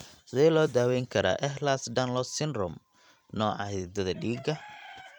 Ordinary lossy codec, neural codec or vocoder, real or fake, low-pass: none; vocoder, 44.1 kHz, 128 mel bands every 256 samples, BigVGAN v2; fake; 19.8 kHz